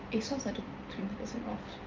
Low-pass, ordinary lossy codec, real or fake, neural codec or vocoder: 7.2 kHz; Opus, 24 kbps; real; none